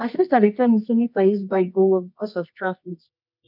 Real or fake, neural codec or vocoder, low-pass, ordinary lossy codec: fake; codec, 24 kHz, 0.9 kbps, WavTokenizer, medium music audio release; 5.4 kHz; none